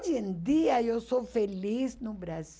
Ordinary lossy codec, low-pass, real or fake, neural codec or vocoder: none; none; real; none